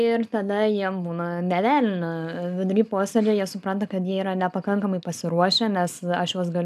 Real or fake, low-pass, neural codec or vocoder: real; 14.4 kHz; none